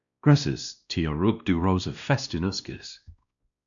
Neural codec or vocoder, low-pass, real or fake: codec, 16 kHz, 1 kbps, X-Codec, WavLM features, trained on Multilingual LibriSpeech; 7.2 kHz; fake